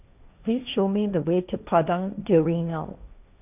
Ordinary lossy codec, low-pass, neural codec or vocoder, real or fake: none; 3.6 kHz; codec, 16 kHz, 1.1 kbps, Voila-Tokenizer; fake